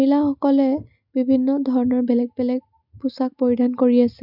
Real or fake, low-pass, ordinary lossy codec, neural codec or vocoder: real; 5.4 kHz; none; none